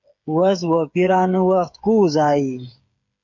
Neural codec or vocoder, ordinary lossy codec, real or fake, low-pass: codec, 16 kHz, 8 kbps, FreqCodec, smaller model; MP3, 48 kbps; fake; 7.2 kHz